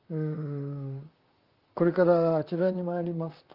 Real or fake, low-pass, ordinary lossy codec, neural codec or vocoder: fake; 5.4 kHz; Opus, 24 kbps; vocoder, 44.1 kHz, 128 mel bands, Pupu-Vocoder